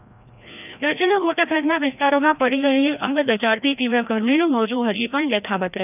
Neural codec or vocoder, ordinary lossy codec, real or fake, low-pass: codec, 16 kHz, 1 kbps, FreqCodec, larger model; none; fake; 3.6 kHz